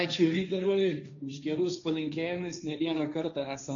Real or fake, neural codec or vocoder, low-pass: fake; codec, 16 kHz, 1.1 kbps, Voila-Tokenizer; 7.2 kHz